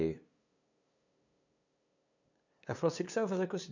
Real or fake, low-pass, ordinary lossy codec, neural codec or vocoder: fake; 7.2 kHz; none; codec, 16 kHz, 2 kbps, FunCodec, trained on LibriTTS, 25 frames a second